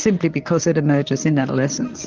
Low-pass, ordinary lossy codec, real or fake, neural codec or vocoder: 7.2 kHz; Opus, 32 kbps; fake; vocoder, 44.1 kHz, 128 mel bands, Pupu-Vocoder